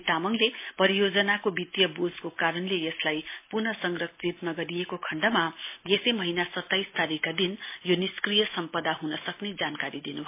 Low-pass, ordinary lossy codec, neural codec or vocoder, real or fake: 3.6 kHz; MP3, 24 kbps; none; real